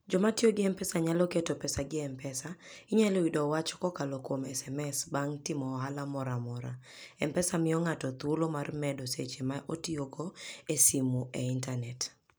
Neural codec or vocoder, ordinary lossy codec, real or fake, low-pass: none; none; real; none